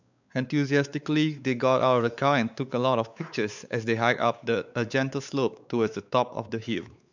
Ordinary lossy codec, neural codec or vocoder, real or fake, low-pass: none; codec, 16 kHz, 4 kbps, X-Codec, WavLM features, trained on Multilingual LibriSpeech; fake; 7.2 kHz